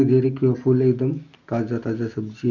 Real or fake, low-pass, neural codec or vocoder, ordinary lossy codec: real; 7.2 kHz; none; MP3, 64 kbps